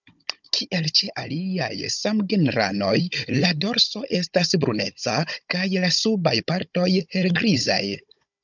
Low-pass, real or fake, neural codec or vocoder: 7.2 kHz; fake; codec, 16 kHz, 16 kbps, FunCodec, trained on Chinese and English, 50 frames a second